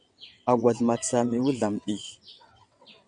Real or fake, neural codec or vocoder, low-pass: fake; vocoder, 22.05 kHz, 80 mel bands, WaveNeXt; 9.9 kHz